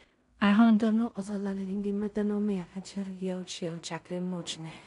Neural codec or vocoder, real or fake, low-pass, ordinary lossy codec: codec, 16 kHz in and 24 kHz out, 0.4 kbps, LongCat-Audio-Codec, two codebook decoder; fake; 10.8 kHz; none